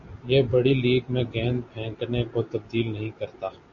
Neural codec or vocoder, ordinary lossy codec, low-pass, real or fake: none; AAC, 64 kbps; 7.2 kHz; real